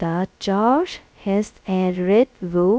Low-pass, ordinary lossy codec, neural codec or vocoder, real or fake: none; none; codec, 16 kHz, 0.2 kbps, FocalCodec; fake